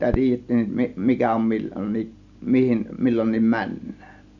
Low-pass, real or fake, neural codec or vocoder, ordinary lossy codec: 7.2 kHz; real; none; none